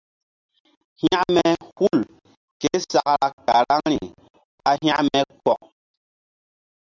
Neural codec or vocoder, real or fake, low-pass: none; real; 7.2 kHz